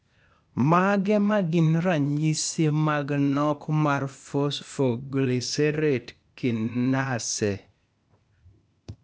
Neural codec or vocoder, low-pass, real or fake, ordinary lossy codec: codec, 16 kHz, 0.8 kbps, ZipCodec; none; fake; none